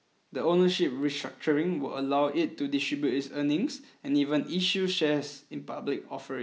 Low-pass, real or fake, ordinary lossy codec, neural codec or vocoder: none; real; none; none